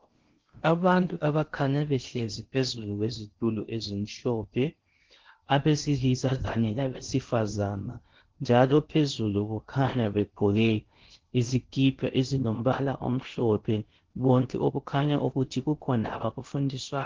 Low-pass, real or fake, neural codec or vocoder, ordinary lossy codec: 7.2 kHz; fake; codec, 16 kHz in and 24 kHz out, 0.6 kbps, FocalCodec, streaming, 4096 codes; Opus, 16 kbps